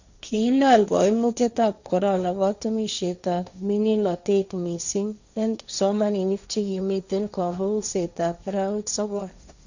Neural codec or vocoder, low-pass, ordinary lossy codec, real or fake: codec, 16 kHz, 1.1 kbps, Voila-Tokenizer; 7.2 kHz; none; fake